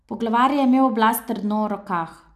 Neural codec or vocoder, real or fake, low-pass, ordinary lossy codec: none; real; 14.4 kHz; none